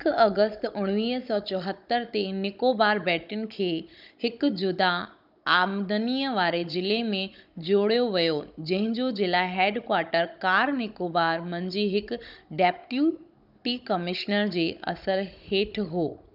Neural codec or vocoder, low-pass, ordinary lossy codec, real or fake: codec, 16 kHz, 16 kbps, FunCodec, trained on Chinese and English, 50 frames a second; 5.4 kHz; none; fake